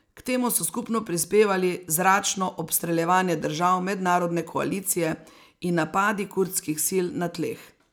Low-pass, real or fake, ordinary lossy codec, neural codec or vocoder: none; real; none; none